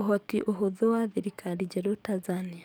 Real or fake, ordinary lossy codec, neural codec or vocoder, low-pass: fake; none; codec, 44.1 kHz, 7.8 kbps, DAC; none